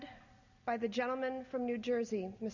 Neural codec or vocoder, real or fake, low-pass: none; real; 7.2 kHz